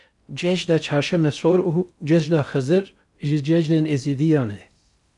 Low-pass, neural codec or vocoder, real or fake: 10.8 kHz; codec, 16 kHz in and 24 kHz out, 0.6 kbps, FocalCodec, streaming, 4096 codes; fake